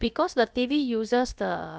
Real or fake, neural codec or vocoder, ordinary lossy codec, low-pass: fake; codec, 16 kHz, about 1 kbps, DyCAST, with the encoder's durations; none; none